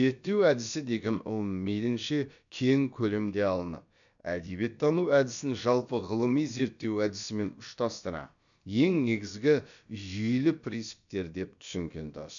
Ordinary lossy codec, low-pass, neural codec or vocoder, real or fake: none; 7.2 kHz; codec, 16 kHz, about 1 kbps, DyCAST, with the encoder's durations; fake